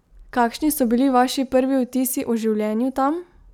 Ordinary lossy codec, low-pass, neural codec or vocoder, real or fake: none; 19.8 kHz; none; real